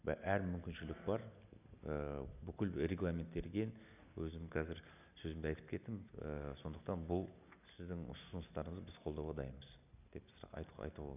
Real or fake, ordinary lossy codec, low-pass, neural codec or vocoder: real; none; 3.6 kHz; none